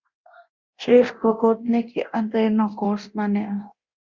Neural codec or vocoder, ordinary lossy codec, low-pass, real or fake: codec, 24 kHz, 0.9 kbps, DualCodec; Opus, 64 kbps; 7.2 kHz; fake